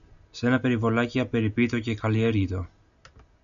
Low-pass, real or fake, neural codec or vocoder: 7.2 kHz; real; none